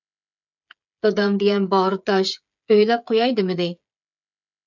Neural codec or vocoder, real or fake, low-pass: codec, 16 kHz, 8 kbps, FreqCodec, smaller model; fake; 7.2 kHz